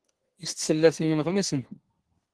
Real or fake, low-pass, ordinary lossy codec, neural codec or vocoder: fake; 10.8 kHz; Opus, 16 kbps; codec, 32 kHz, 1.9 kbps, SNAC